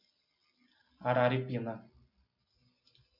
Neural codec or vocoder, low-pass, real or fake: none; 5.4 kHz; real